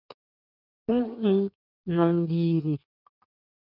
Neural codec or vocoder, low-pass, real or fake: codec, 16 kHz in and 24 kHz out, 1.1 kbps, FireRedTTS-2 codec; 5.4 kHz; fake